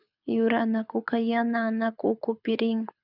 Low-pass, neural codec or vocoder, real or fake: 5.4 kHz; codec, 24 kHz, 6 kbps, HILCodec; fake